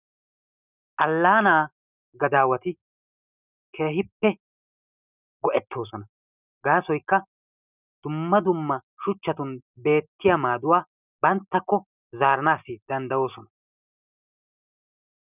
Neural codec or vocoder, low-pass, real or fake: none; 3.6 kHz; real